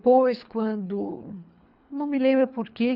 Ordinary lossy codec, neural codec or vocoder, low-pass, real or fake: none; codec, 24 kHz, 3 kbps, HILCodec; 5.4 kHz; fake